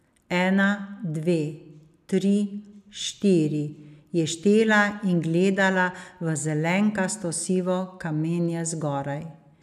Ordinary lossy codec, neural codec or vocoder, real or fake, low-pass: none; none; real; 14.4 kHz